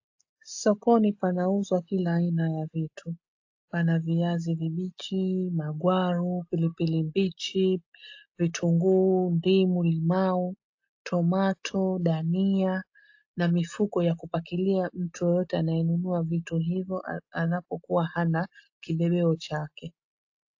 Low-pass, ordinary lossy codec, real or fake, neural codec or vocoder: 7.2 kHz; AAC, 48 kbps; real; none